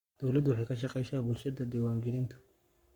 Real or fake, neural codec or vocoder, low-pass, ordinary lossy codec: fake; codec, 44.1 kHz, 7.8 kbps, Pupu-Codec; 19.8 kHz; Opus, 64 kbps